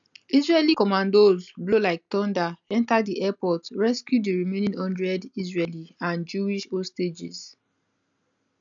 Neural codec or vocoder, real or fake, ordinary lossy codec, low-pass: none; real; none; 7.2 kHz